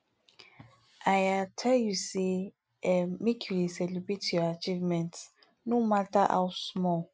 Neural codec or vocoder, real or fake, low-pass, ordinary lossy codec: none; real; none; none